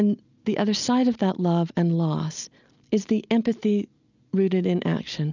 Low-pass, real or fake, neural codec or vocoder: 7.2 kHz; real; none